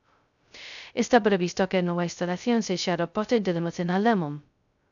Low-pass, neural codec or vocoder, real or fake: 7.2 kHz; codec, 16 kHz, 0.2 kbps, FocalCodec; fake